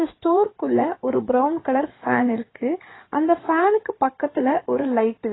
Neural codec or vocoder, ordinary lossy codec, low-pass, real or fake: codec, 16 kHz in and 24 kHz out, 2.2 kbps, FireRedTTS-2 codec; AAC, 16 kbps; 7.2 kHz; fake